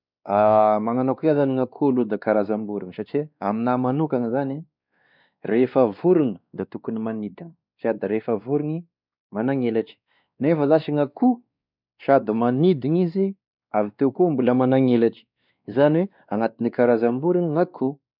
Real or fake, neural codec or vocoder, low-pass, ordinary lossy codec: fake; codec, 16 kHz, 2 kbps, X-Codec, WavLM features, trained on Multilingual LibriSpeech; 5.4 kHz; none